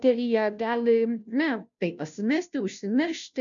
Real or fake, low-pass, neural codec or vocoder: fake; 7.2 kHz; codec, 16 kHz, 0.5 kbps, FunCodec, trained on Chinese and English, 25 frames a second